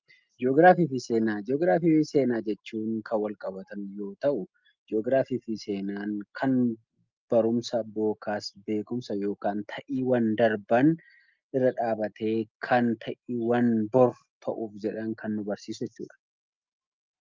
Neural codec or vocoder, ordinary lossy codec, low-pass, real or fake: none; Opus, 24 kbps; 7.2 kHz; real